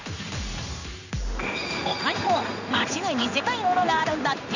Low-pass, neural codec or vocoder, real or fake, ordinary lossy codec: 7.2 kHz; codec, 16 kHz in and 24 kHz out, 1 kbps, XY-Tokenizer; fake; none